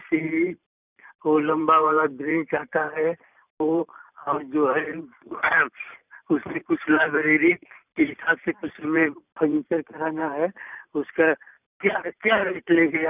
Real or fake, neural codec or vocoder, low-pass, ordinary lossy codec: fake; vocoder, 44.1 kHz, 128 mel bands every 512 samples, BigVGAN v2; 3.6 kHz; none